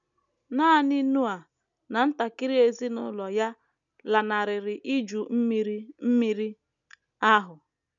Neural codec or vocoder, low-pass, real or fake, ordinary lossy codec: none; 7.2 kHz; real; none